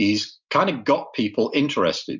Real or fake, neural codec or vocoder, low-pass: real; none; 7.2 kHz